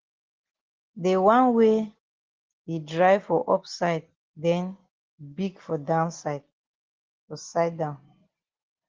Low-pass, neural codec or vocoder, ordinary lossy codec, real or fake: 7.2 kHz; none; Opus, 16 kbps; real